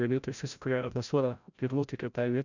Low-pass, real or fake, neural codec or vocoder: 7.2 kHz; fake; codec, 16 kHz, 0.5 kbps, FreqCodec, larger model